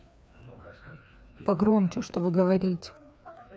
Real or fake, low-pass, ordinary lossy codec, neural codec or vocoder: fake; none; none; codec, 16 kHz, 2 kbps, FreqCodec, larger model